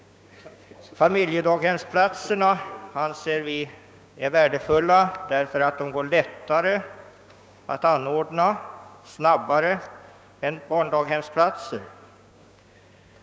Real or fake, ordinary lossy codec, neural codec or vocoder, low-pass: fake; none; codec, 16 kHz, 6 kbps, DAC; none